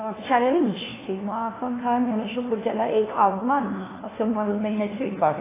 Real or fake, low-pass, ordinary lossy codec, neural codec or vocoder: fake; 3.6 kHz; AAC, 16 kbps; codec, 16 kHz, 1 kbps, FunCodec, trained on LibriTTS, 50 frames a second